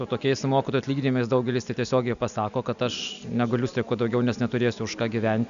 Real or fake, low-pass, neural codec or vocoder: real; 7.2 kHz; none